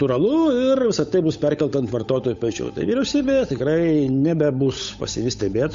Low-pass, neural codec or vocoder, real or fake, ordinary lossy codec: 7.2 kHz; codec, 16 kHz, 8 kbps, FunCodec, trained on Chinese and English, 25 frames a second; fake; MP3, 48 kbps